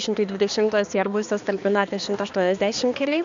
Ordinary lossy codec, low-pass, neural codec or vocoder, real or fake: MP3, 64 kbps; 7.2 kHz; codec, 16 kHz, 2 kbps, X-Codec, HuBERT features, trained on balanced general audio; fake